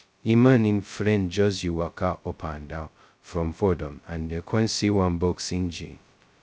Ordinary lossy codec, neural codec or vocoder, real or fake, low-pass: none; codec, 16 kHz, 0.2 kbps, FocalCodec; fake; none